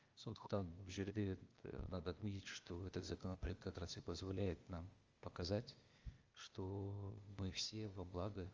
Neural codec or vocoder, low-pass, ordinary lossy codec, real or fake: codec, 16 kHz, 0.8 kbps, ZipCodec; 7.2 kHz; Opus, 32 kbps; fake